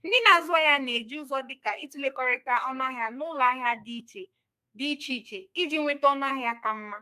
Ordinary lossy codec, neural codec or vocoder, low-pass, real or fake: AAC, 96 kbps; codec, 32 kHz, 1.9 kbps, SNAC; 14.4 kHz; fake